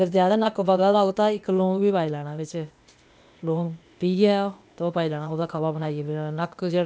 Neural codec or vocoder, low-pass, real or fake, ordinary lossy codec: codec, 16 kHz, 0.8 kbps, ZipCodec; none; fake; none